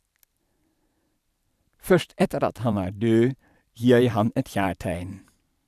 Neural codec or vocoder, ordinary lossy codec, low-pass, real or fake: vocoder, 44.1 kHz, 128 mel bands every 512 samples, BigVGAN v2; none; 14.4 kHz; fake